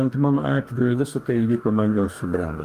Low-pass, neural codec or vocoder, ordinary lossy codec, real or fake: 14.4 kHz; codec, 44.1 kHz, 2.6 kbps, DAC; Opus, 32 kbps; fake